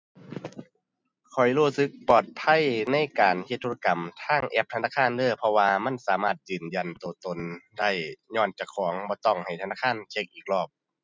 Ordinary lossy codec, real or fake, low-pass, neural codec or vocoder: none; real; none; none